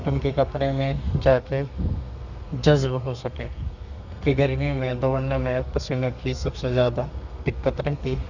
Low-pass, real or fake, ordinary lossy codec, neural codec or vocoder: 7.2 kHz; fake; none; codec, 32 kHz, 1.9 kbps, SNAC